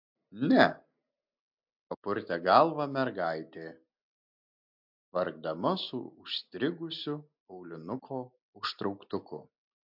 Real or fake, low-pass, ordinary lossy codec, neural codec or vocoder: real; 5.4 kHz; MP3, 48 kbps; none